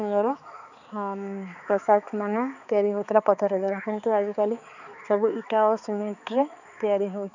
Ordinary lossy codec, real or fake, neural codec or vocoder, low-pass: none; fake; codec, 16 kHz, 4 kbps, X-Codec, HuBERT features, trained on balanced general audio; 7.2 kHz